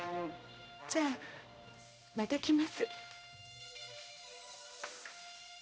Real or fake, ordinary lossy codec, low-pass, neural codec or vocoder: fake; none; none; codec, 16 kHz, 1 kbps, X-Codec, HuBERT features, trained on balanced general audio